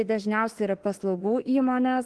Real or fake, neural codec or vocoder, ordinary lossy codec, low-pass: fake; autoencoder, 48 kHz, 32 numbers a frame, DAC-VAE, trained on Japanese speech; Opus, 16 kbps; 10.8 kHz